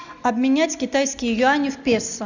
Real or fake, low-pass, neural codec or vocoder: real; 7.2 kHz; none